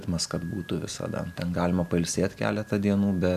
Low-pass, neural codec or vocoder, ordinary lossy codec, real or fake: 14.4 kHz; none; AAC, 96 kbps; real